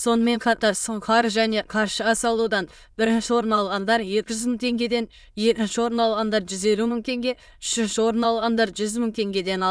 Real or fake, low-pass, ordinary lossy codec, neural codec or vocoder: fake; none; none; autoencoder, 22.05 kHz, a latent of 192 numbers a frame, VITS, trained on many speakers